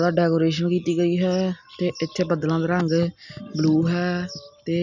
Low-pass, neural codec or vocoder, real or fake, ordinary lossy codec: 7.2 kHz; none; real; none